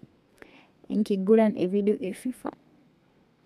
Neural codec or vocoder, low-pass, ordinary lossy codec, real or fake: codec, 32 kHz, 1.9 kbps, SNAC; 14.4 kHz; none; fake